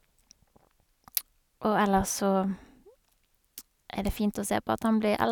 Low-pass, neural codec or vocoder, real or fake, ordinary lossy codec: 19.8 kHz; none; real; none